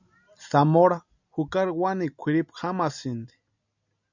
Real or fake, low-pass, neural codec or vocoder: real; 7.2 kHz; none